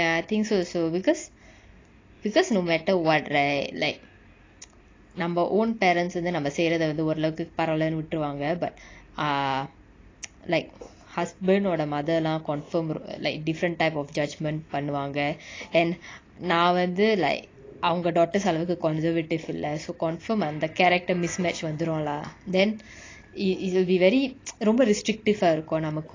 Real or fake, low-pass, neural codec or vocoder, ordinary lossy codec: real; 7.2 kHz; none; AAC, 32 kbps